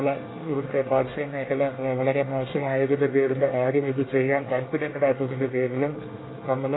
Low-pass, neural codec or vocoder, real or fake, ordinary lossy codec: 7.2 kHz; codec, 24 kHz, 1 kbps, SNAC; fake; AAC, 16 kbps